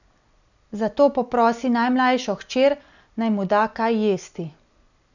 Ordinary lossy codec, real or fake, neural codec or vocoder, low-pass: none; real; none; 7.2 kHz